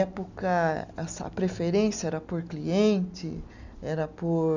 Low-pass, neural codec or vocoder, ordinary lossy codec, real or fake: 7.2 kHz; none; none; real